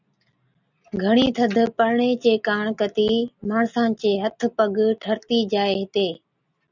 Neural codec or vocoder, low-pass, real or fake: none; 7.2 kHz; real